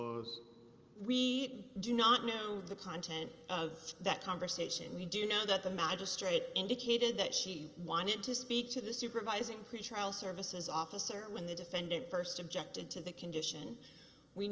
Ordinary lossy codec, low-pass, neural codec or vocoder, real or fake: Opus, 24 kbps; 7.2 kHz; none; real